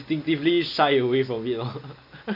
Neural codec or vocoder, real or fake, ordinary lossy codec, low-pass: none; real; none; 5.4 kHz